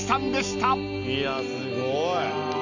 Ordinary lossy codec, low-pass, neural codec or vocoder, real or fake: none; 7.2 kHz; none; real